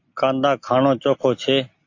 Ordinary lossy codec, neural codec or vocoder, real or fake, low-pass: AAC, 48 kbps; none; real; 7.2 kHz